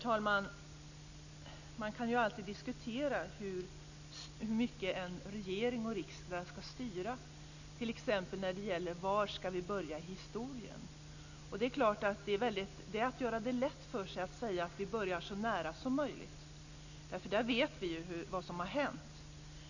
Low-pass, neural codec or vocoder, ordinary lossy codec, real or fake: 7.2 kHz; none; none; real